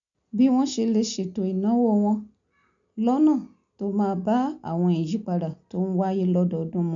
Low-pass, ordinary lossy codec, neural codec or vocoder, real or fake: 7.2 kHz; none; none; real